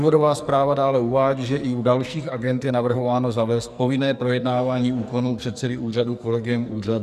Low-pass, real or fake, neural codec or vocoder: 14.4 kHz; fake; codec, 44.1 kHz, 2.6 kbps, SNAC